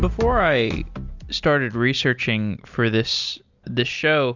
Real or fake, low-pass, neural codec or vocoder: real; 7.2 kHz; none